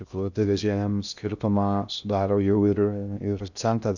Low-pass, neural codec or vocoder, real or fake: 7.2 kHz; codec, 16 kHz in and 24 kHz out, 0.6 kbps, FocalCodec, streaming, 2048 codes; fake